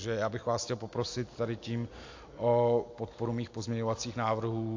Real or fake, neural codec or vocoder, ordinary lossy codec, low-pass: real; none; AAC, 48 kbps; 7.2 kHz